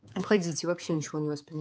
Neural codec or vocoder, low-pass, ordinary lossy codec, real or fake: codec, 16 kHz, 4 kbps, X-Codec, HuBERT features, trained on balanced general audio; none; none; fake